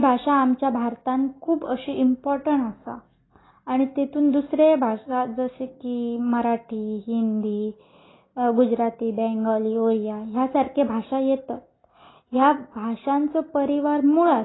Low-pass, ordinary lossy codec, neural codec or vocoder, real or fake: 7.2 kHz; AAC, 16 kbps; none; real